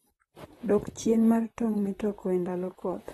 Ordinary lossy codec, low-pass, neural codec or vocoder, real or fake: AAC, 32 kbps; 19.8 kHz; vocoder, 44.1 kHz, 128 mel bands, Pupu-Vocoder; fake